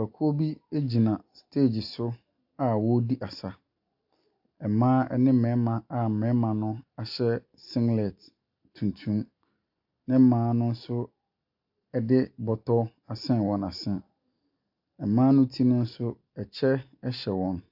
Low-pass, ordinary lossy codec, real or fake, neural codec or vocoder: 5.4 kHz; AAC, 48 kbps; real; none